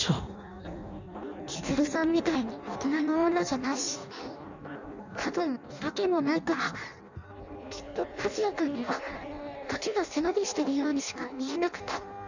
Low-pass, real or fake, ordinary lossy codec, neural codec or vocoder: 7.2 kHz; fake; none; codec, 16 kHz in and 24 kHz out, 0.6 kbps, FireRedTTS-2 codec